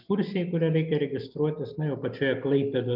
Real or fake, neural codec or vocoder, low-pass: real; none; 5.4 kHz